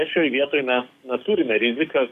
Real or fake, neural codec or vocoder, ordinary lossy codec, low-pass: fake; codec, 44.1 kHz, 7.8 kbps, DAC; AAC, 96 kbps; 14.4 kHz